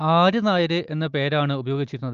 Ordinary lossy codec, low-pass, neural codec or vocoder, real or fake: Opus, 24 kbps; 7.2 kHz; none; real